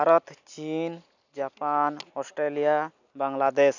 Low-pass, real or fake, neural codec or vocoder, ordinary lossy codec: 7.2 kHz; real; none; none